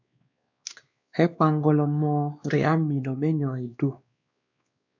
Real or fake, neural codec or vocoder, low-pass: fake; codec, 16 kHz, 2 kbps, X-Codec, WavLM features, trained on Multilingual LibriSpeech; 7.2 kHz